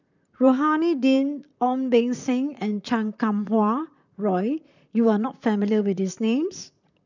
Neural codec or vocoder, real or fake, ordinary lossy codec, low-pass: vocoder, 44.1 kHz, 128 mel bands, Pupu-Vocoder; fake; none; 7.2 kHz